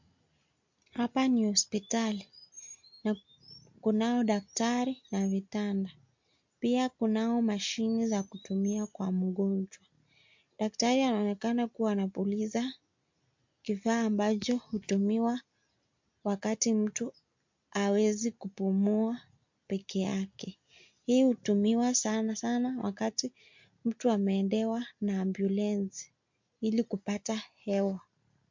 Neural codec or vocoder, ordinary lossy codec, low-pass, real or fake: none; MP3, 48 kbps; 7.2 kHz; real